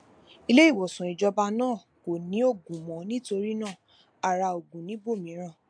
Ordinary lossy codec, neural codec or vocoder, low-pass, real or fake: AAC, 64 kbps; none; 9.9 kHz; real